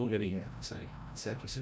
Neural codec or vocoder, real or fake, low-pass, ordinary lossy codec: codec, 16 kHz, 0.5 kbps, FreqCodec, larger model; fake; none; none